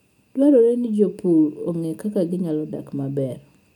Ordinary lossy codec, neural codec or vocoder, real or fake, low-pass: none; none; real; 19.8 kHz